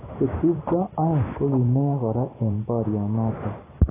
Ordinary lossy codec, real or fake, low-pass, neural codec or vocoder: AAC, 16 kbps; fake; 3.6 kHz; vocoder, 44.1 kHz, 128 mel bands every 512 samples, BigVGAN v2